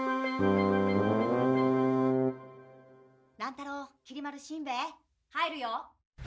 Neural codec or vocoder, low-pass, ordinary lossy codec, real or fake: none; none; none; real